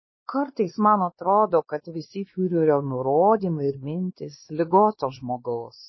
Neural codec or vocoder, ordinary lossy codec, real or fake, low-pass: codec, 16 kHz, 2 kbps, X-Codec, WavLM features, trained on Multilingual LibriSpeech; MP3, 24 kbps; fake; 7.2 kHz